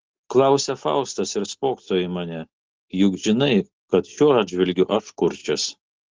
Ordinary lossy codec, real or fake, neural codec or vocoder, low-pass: Opus, 16 kbps; real; none; 7.2 kHz